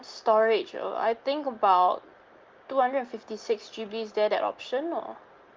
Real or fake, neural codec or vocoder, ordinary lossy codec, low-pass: real; none; Opus, 32 kbps; 7.2 kHz